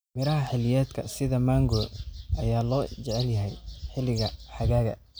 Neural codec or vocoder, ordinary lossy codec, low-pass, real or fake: none; none; none; real